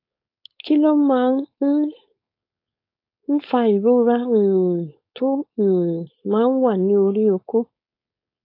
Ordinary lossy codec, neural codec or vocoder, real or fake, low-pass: AAC, 32 kbps; codec, 16 kHz, 4.8 kbps, FACodec; fake; 5.4 kHz